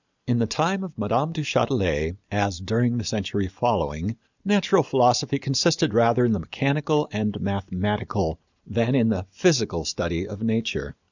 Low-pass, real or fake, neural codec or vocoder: 7.2 kHz; real; none